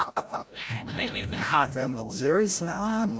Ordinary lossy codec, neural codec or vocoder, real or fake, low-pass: none; codec, 16 kHz, 0.5 kbps, FreqCodec, larger model; fake; none